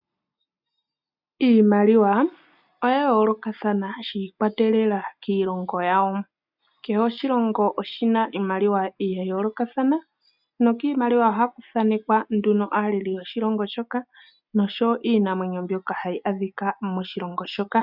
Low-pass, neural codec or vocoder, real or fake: 5.4 kHz; none; real